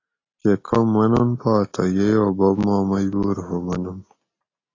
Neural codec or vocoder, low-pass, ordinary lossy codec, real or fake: none; 7.2 kHz; AAC, 32 kbps; real